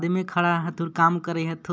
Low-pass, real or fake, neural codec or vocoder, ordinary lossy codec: none; real; none; none